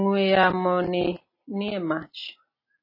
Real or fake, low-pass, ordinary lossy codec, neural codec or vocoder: real; 5.4 kHz; MP3, 24 kbps; none